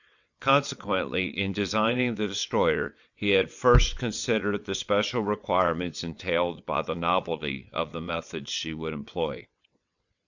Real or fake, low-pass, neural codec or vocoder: fake; 7.2 kHz; vocoder, 22.05 kHz, 80 mel bands, WaveNeXt